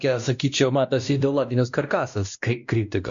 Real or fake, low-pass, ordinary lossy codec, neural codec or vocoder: fake; 7.2 kHz; MP3, 64 kbps; codec, 16 kHz, 1 kbps, X-Codec, WavLM features, trained on Multilingual LibriSpeech